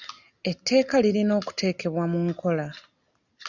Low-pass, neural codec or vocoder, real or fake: 7.2 kHz; none; real